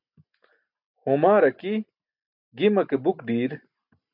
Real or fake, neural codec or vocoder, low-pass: real; none; 5.4 kHz